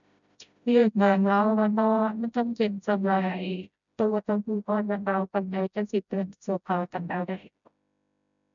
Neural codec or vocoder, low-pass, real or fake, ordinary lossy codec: codec, 16 kHz, 0.5 kbps, FreqCodec, smaller model; 7.2 kHz; fake; none